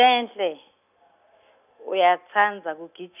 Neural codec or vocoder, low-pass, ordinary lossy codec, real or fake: none; 3.6 kHz; none; real